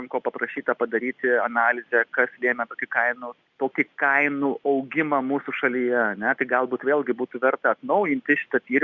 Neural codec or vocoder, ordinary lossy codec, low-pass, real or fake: none; Opus, 64 kbps; 7.2 kHz; real